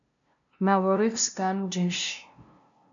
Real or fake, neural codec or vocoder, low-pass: fake; codec, 16 kHz, 0.5 kbps, FunCodec, trained on LibriTTS, 25 frames a second; 7.2 kHz